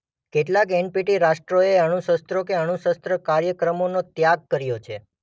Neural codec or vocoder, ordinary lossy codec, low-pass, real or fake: none; none; none; real